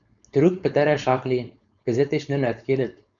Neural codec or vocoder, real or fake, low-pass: codec, 16 kHz, 4.8 kbps, FACodec; fake; 7.2 kHz